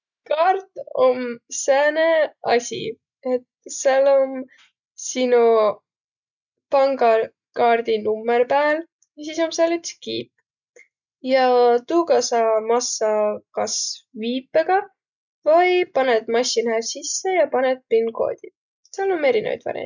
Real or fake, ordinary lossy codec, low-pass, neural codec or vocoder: real; none; 7.2 kHz; none